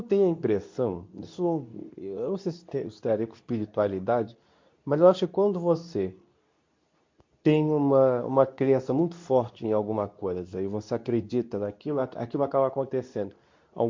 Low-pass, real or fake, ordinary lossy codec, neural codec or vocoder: 7.2 kHz; fake; MP3, 48 kbps; codec, 24 kHz, 0.9 kbps, WavTokenizer, medium speech release version 2